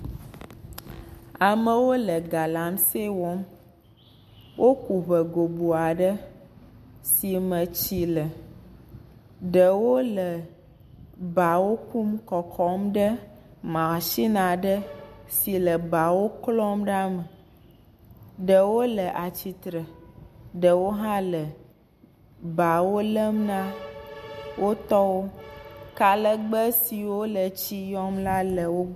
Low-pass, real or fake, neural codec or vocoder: 14.4 kHz; real; none